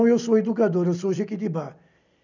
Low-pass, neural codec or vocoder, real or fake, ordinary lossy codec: 7.2 kHz; none; real; none